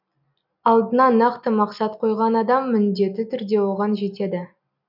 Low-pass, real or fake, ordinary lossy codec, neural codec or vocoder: 5.4 kHz; real; none; none